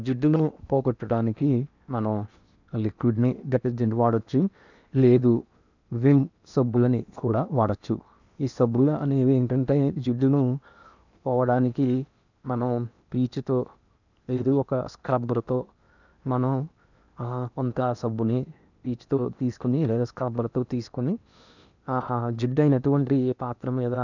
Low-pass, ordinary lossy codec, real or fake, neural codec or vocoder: 7.2 kHz; none; fake; codec, 16 kHz in and 24 kHz out, 0.8 kbps, FocalCodec, streaming, 65536 codes